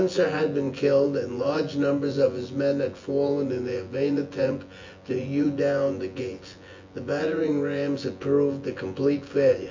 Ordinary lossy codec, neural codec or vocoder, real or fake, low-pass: MP3, 48 kbps; vocoder, 24 kHz, 100 mel bands, Vocos; fake; 7.2 kHz